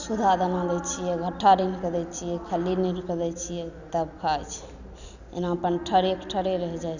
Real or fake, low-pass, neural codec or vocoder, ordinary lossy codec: real; 7.2 kHz; none; none